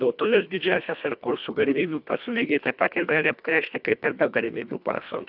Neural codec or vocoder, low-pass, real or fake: codec, 24 kHz, 1.5 kbps, HILCodec; 5.4 kHz; fake